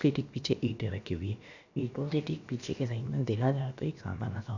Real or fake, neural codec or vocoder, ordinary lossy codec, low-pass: fake; codec, 16 kHz, about 1 kbps, DyCAST, with the encoder's durations; none; 7.2 kHz